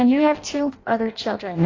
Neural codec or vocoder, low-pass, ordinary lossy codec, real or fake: codec, 16 kHz in and 24 kHz out, 0.6 kbps, FireRedTTS-2 codec; 7.2 kHz; AAC, 32 kbps; fake